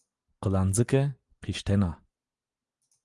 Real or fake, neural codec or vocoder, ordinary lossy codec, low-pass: real; none; Opus, 24 kbps; 10.8 kHz